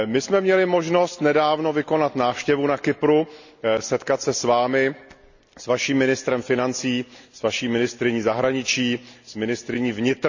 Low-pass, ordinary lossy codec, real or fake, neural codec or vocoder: 7.2 kHz; none; real; none